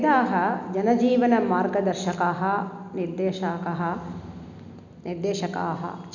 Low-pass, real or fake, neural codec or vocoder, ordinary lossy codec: 7.2 kHz; real; none; none